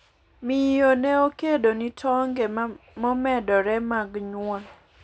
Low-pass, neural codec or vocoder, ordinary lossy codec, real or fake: none; none; none; real